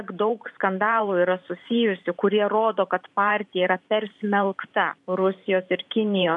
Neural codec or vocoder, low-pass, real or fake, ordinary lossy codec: vocoder, 44.1 kHz, 128 mel bands every 512 samples, BigVGAN v2; 10.8 kHz; fake; MP3, 64 kbps